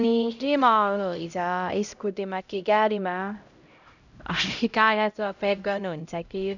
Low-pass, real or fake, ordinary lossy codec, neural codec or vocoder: 7.2 kHz; fake; none; codec, 16 kHz, 0.5 kbps, X-Codec, HuBERT features, trained on LibriSpeech